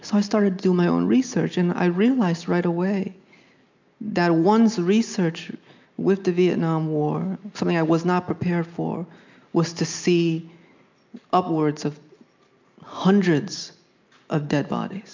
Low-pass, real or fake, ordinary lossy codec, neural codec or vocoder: 7.2 kHz; real; MP3, 64 kbps; none